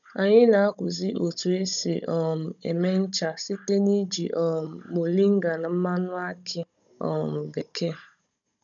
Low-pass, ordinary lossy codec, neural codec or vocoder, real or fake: 7.2 kHz; none; codec, 16 kHz, 16 kbps, FunCodec, trained on Chinese and English, 50 frames a second; fake